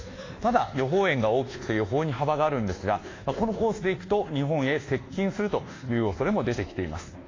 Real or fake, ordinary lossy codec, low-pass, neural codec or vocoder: fake; AAC, 32 kbps; 7.2 kHz; codec, 24 kHz, 1.2 kbps, DualCodec